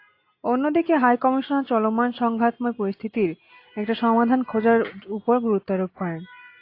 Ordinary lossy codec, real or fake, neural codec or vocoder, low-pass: AAC, 32 kbps; real; none; 5.4 kHz